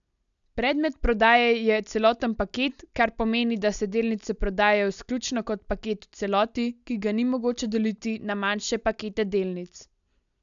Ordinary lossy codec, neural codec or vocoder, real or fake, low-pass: none; none; real; 7.2 kHz